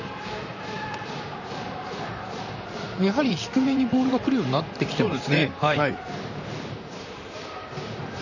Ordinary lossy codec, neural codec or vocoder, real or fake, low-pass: none; vocoder, 44.1 kHz, 128 mel bands, Pupu-Vocoder; fake; 7.2 kHz